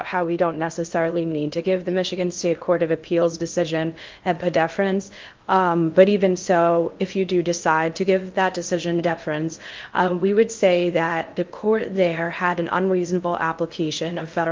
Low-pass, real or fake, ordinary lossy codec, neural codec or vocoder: 7.2 kHz; fake; Opus, 32 kbps; codec, 16 kHz in and 24 kHz out, 0.6 kbps, FocalCodec, streaming, 2048 codes